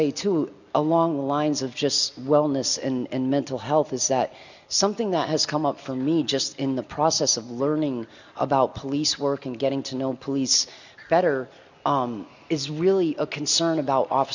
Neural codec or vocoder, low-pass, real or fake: none; 7.2 kHz; real